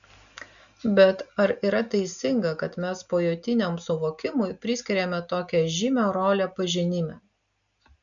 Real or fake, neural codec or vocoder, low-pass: real; none; 7.2 kHz